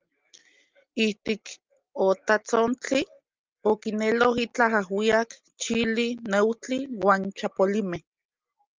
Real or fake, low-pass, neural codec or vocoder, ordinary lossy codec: real; 7.2 kHz; none; Opus, 24 kbps